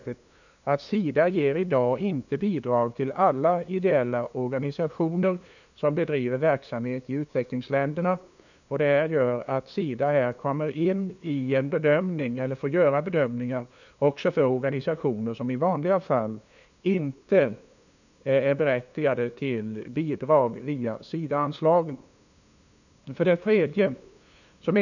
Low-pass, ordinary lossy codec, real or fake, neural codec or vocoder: 7.2 kHz; none; fake; codec, 16 kHz, 2 kbps, FunCodec, trained on LibriTTS, 25 frames a second